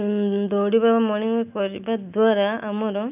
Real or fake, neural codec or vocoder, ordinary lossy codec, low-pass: real; none; none; 3.6 kHz